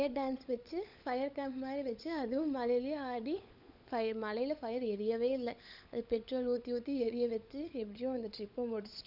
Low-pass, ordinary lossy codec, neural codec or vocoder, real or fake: 5.4 kHz; none; codec, 16 kHz, 16 kbps, FunCodec, trained on LibriTTS, 50 frames a second; fake